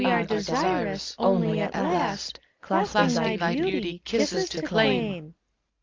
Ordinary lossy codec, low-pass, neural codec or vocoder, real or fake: Opus, 24 kbps; 7.2 kHz; none; real